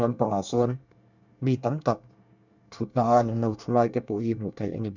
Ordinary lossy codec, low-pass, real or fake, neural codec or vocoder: none; 7.2 kHz; fake; codec, 24 kHz, 1 kbps, SNAC